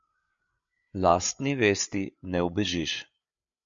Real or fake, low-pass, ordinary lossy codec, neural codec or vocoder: fake; 7.2 kHz; MP3, 64 kbps; codec, 16 kHz, 8 kbps, FreqCodec, larger model